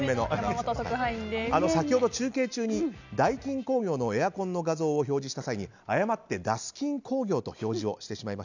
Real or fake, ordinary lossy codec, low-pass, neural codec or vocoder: real; none; 7.2 kHz; none